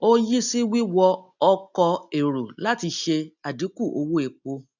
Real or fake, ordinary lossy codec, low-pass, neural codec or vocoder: real; none; 7.2 kHz; none